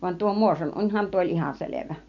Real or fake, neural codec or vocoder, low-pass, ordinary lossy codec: real; none; 7.2 kHz; none